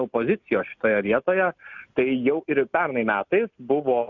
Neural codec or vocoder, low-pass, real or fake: none; 7.2 kHz; real